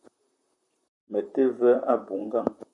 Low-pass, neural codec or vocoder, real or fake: 10.8 kHz; vocoder, 44.1 kHz, 128 mel bands, Pupu-Vocoder; fake